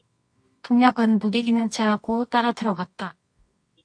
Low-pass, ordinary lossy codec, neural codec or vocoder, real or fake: 9.9 kHz; MP3, 48 kbps; codec, 24 kHz, 0.9 kbps, WavTokenizer, medium music audio release; fake